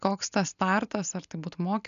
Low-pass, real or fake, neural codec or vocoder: 7.2 kHz; real; none